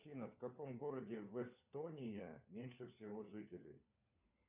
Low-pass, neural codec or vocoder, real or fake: 3.6 kHz; codec, 16 kHz, 4 kbps, FunCodec, trained on LibriTTS, 50 frames a second; fake